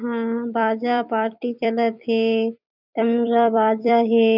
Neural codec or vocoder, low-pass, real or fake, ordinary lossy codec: vocoder, 44.1 kHz, 128 mel bands every 256 samples, BigVGAN v2; 5.4 kHz; fake; none